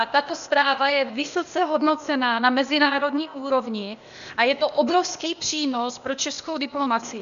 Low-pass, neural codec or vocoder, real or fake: 7.2 kHz; codec, 16 kHz, 0.8 kbps, ZipCodec; fake